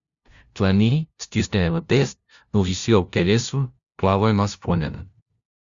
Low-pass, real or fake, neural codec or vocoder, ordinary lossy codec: 7.2 kHz; fake; codec, 16 kHz, 0.5 kbps, FunCodec, trained on LibriTTS, 25 frames a second; Opus, 64 kbps